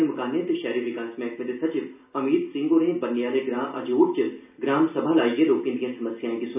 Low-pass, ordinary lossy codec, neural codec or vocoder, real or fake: 3.6 kHz; none; none; real